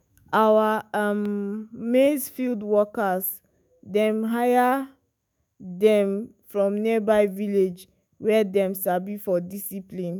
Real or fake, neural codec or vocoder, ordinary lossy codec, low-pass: fake; autoencoder, 48 kHz, 128 numbers a frame, DAC-VAE, trained on Japanese speech; none; none